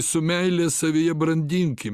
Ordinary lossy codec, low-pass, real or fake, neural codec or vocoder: Opus, 64 kbps; 14.4 kHz; real; none